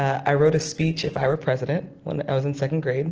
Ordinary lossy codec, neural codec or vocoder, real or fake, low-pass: Opus, 16 kbps; none; real; 7.2 kHz